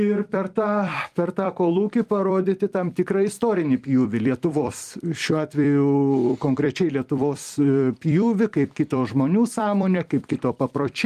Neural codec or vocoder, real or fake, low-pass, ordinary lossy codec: vocoder, 44.1 kHz, 128 mel bands every 256 samples, BigVGAN v2; fake; 14.4 kHz; Opus, 32 kbps